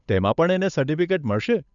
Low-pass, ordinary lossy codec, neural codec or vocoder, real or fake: 7.2 kHz; none; codec, 16 kHz, 8 kbps, FunCodec, trained on Chinese and English, 25 frames a second; fake